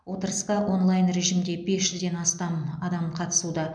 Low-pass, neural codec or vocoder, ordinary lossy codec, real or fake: 9.9 kHz; none; none; real